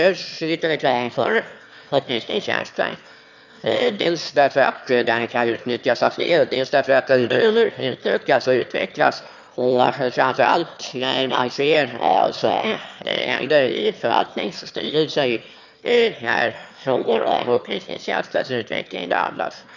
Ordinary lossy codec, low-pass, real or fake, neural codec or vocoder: none; 7.2 kHz; fake; autoencoder, 22.05 kHz, a latent of 192 numbers a frame, VITS, trained on one speaker